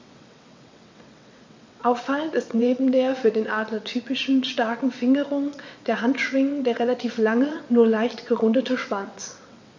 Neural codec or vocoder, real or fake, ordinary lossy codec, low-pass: vocoder, 22.05 kHz, 80 mel bands, WaveNeXt; fake; MP3, 64 kbps; 7.2 kHz